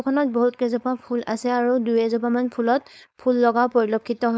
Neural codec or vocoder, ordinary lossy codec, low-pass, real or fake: codec, 16 kHz, 4.8 kbps, FACodec; none; none; fake